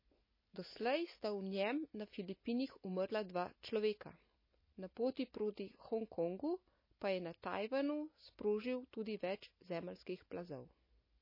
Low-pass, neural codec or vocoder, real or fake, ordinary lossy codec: 5.4 kHz; none; real; MP3, 24 kbps